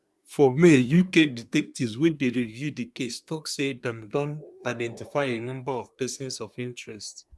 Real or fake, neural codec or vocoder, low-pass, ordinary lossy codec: fake; codec, 24 kHz, 1 kbps, SNAC; none; none